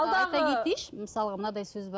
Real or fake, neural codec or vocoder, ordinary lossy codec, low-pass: real; none; none; none